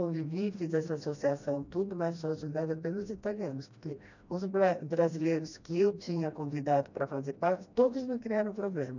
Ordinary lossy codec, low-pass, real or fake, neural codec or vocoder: none; 7.2 kHz; fake; codec, 16 kHz, 1 kbps, FreqCodec, smaller model